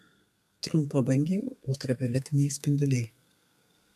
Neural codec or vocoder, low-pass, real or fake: codec, 32 kHz, 1.9 kbps, SNAC; 14.4 kHz; fake